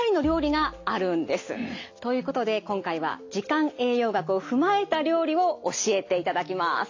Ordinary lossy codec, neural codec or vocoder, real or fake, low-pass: AAC, 48 kbps; none; real; 7.2 kHz